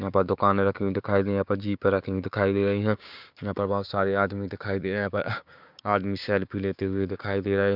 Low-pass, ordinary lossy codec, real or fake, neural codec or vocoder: 5.4 kHz; Opus, 64 kbps; fake; codec, 16 kHz, 6 kbps, DAC